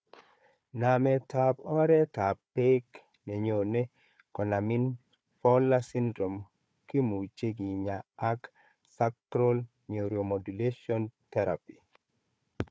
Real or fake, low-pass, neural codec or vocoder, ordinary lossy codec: fake; none; codec, 16 kHz, 4 kbps, FunCodec, trained on Chinese and English, 50 frames a second; none